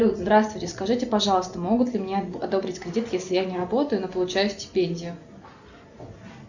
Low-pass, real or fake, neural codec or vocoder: 7.2 kHz; real; none